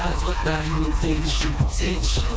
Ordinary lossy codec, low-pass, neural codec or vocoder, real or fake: none; none; codec, 16 kHz, 2 kbps, FreqCodec, smaller model; fake